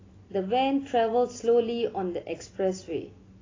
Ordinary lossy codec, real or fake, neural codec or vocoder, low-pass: AAC, 32 kbps; real; none; 7.2 kHz